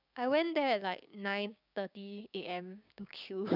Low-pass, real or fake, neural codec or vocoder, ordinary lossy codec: 5.4 kHz; fake; codec, 16 kHz, 6 kbps, DAC; none